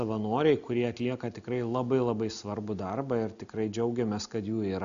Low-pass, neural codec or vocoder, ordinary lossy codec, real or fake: 7.2 kHz; none; AAC, 96 kbps; real